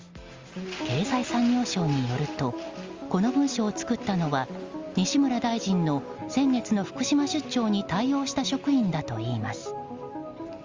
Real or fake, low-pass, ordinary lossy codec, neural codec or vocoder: real; 7.2 kHz; Opus, 32 kbps; none